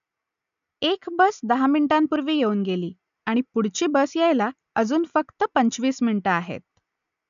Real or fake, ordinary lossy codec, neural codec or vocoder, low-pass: real; none; none; 7.2 kHz